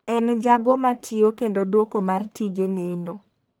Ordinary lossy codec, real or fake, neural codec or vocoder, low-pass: none; fake; codec, 44.1 kHz, 1.7 kbps, Pupu-Codec; none